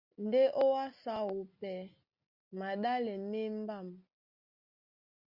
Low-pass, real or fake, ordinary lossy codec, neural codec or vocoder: 5.4 kHz; real; Opus, 64 kbps; none